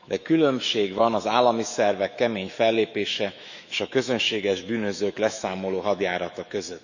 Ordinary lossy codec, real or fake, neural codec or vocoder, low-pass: none; fake; autoencoder, 48 kHz, 128 numbers a frame, DAC-VAE, trained on Japanese speech; 7.2 kHz